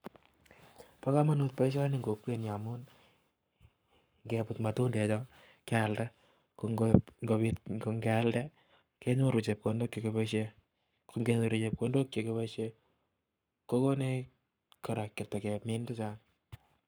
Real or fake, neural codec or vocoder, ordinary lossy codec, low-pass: fake; codec, 44.1 kHz, 7.8 kbps, Pupu-Codec; none; none